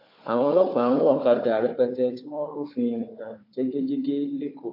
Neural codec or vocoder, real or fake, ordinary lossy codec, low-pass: codec, 16 kHz, 4 kbps, FunCodec, trained on Chinese and English, 50 frames a second; fake; AAC, 32 kbps; 5.4 kHz